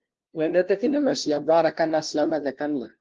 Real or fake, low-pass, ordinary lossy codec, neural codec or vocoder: fake; 7.2 kHz; Opus, 16 kbps; codec, 16 kHz, 0.5 kbps, FunCodec, trained on LibriTTS, 25 frames a second